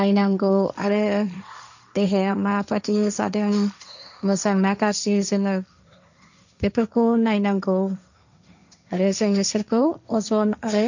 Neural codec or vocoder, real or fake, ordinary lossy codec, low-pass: codec, 16 kHz, 1.1 kbps, Voila-Tokenizer; fake; none; 7.2 kHz